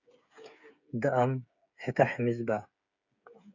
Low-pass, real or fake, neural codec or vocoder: 7.2 kHz; fake; codec, 16 kHz, 8 kbps, FreqCodec, smaller model